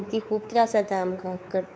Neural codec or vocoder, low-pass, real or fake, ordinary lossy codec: codec, 16 kHz, 4 kbps, X-Codec, WavLM features, trained on Multilingual LibriSpeech; none; fake; none